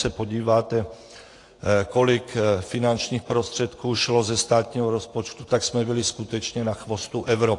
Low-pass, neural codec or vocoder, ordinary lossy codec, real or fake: 10.8 kHz; vocoder, 24 kHz, 100 mel bands, Vocos; AAC, 48 kbps; fake